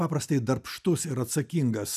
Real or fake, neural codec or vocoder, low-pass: real; none; 14.4 kHz